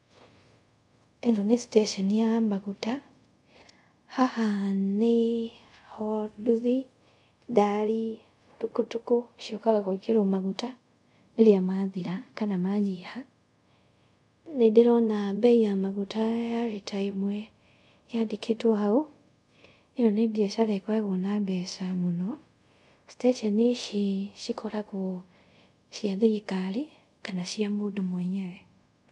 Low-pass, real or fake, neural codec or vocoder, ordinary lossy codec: 10.8 kHz; fake; codec, 24 kHz, 0.5 kbps, DualCodec; MP3, 64 kbps